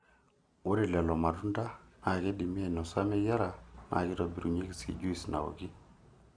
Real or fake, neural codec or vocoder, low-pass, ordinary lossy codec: real; none; 9.9 kHz; none